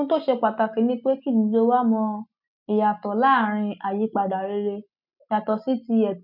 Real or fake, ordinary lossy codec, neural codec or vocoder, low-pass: real; none; none; 5.4 kHz